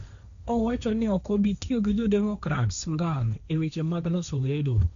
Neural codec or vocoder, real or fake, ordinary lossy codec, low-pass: codec, 16 kHz, 1.1 kbps, Voila-Tokenizer; fake; none; 7.2 kHz